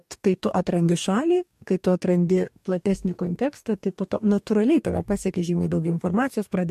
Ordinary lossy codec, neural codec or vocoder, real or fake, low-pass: MP3, 64 kbps; codec, 44.1 kHz, 2.6 kbps, DAC; fake; 14.4 kHz